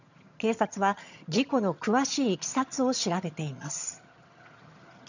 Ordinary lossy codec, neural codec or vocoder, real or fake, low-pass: none; vocoder, 22.05 kHz, 80 mel bands, HiFi-GAN; fake; 7.2 kHz